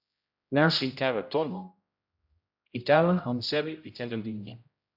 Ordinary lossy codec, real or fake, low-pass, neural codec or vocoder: AAC, 48 kbps; fake; 5.4 kHz; codec, 16 kHz, 0.5 kbps, X-Codec, HuBERT features, trained on general audio